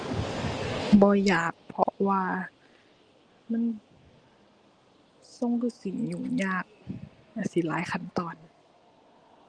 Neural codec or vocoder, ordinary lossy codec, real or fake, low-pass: none; Opus, 24 kbps; real; 9.9 kHz